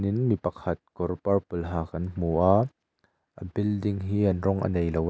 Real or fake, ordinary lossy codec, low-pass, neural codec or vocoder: real; none; none; none